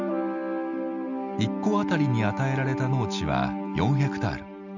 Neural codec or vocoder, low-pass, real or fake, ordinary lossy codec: none; 7.2 kHz; real; none